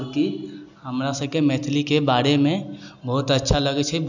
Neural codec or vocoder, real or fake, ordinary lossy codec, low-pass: none; real; none; 7.2 kHz